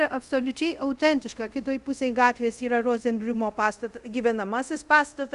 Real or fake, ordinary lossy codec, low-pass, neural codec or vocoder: fake; Opus, 64 kbps; 10.8 kHz; codec, 24 kHz, 0.5 kbps, DualCodec